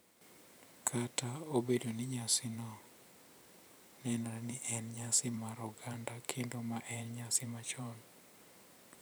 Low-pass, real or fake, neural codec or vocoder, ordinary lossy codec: none; fake; vocoder, 44.1 kHz, 128 mel bands every 512 samples, BigVGAN v2; none